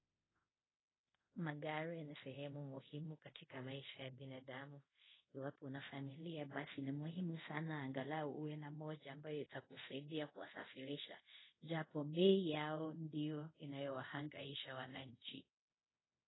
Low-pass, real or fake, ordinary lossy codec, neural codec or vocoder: 7.2 kHz; fake; AAC, 16 kbps; codec, 24 kHz, 0.5 kbps, DualCodec